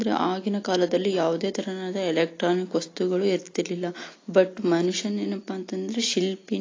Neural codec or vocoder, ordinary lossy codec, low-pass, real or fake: none; AAC, 32 kbps; 7.2 kHz; real